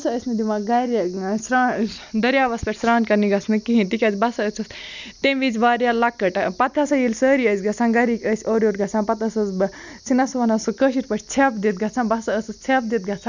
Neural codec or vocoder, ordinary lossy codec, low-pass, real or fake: none; none; 7.2 kHz; real